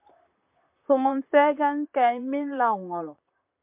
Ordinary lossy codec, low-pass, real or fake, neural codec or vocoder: MP3, 32 kbps; 3.6 kHz; fake; vocoder, 44.1 kHz, 128 mel bands, Pupu-Vocoder